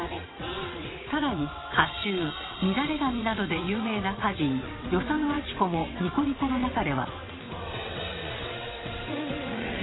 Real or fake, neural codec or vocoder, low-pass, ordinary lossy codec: fake; vocoder, 22.05 kHz, 80 mel bands, WaveNeXt; 7.2 kHz; AAC, 16 kbps